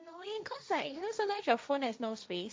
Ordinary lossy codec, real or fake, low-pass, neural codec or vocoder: none; fake; none; codec, 16 kHz, 1.1 kbps, Voila-Tokenizer